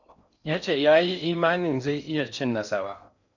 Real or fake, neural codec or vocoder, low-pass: fake; codec, 16 kHz in and 24 kHz out, 0.6 kbps, FocalCodec, streaming, 4096 codes; 7.2 kHz